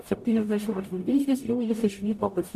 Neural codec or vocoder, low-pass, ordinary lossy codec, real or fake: codec, 44.1 kHz, 0.9 kbps, DAC; 14.4 kHz; MP3, 64 kbps; fake